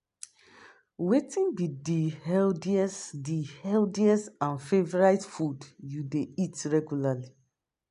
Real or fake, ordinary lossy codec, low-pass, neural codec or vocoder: real; none; 10.8 kHz; none